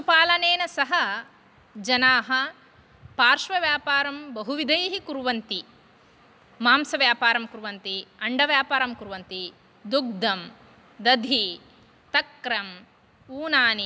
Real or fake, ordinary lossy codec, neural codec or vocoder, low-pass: real; none; none; none